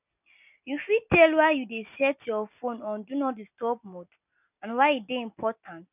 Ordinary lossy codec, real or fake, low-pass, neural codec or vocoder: none; real; 3.6 kHz; none